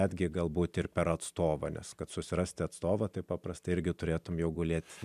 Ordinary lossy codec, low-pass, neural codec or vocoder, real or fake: MP3, 96 kbps; 14.4 kHz; none; real